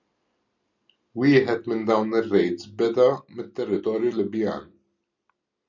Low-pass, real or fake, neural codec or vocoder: 7.2 kHz; real; none